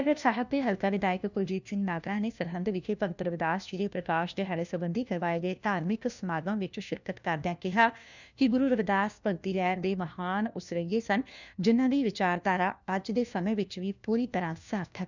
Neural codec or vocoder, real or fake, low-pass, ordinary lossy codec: codec, 16 kHz, 1 kbps, FunCodec, trained on LibriTTS, 50 frames a second; fake; 7.2 kHz; none